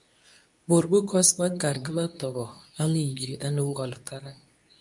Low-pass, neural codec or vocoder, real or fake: 10.8 kHz; codec, 24 kHz, 0.9 kbps, WavTokenizer, medium speech release version 2; fake